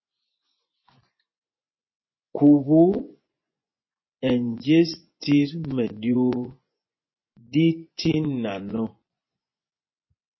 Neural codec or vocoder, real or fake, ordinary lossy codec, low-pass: vocoder, 24 kHz, 100 mel bands, Vocos; fake; MP3, 24 kbps; 7.2 kHz